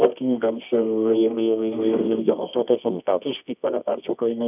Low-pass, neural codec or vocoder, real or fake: 3.6 kHz; codec, 24 kHz, 0.9 kbps, WavTokenizer, medium music audio release; fake